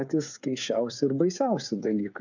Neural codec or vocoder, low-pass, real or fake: codec, 16 kHz, 8 kbps, FreqCodec, smaller model; 7.2 kHz; fake